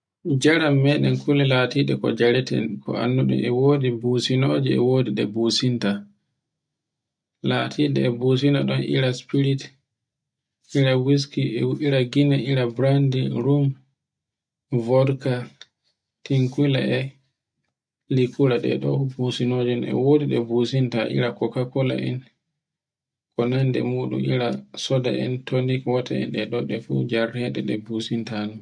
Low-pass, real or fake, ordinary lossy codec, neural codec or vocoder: 9.9 kHz; real; none; none